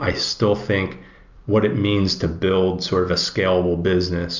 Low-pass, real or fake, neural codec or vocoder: 7.2 kHz; real; none